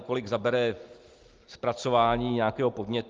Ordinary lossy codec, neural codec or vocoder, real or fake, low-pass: Opus, 24 kbps; none; real; 7.2 kHz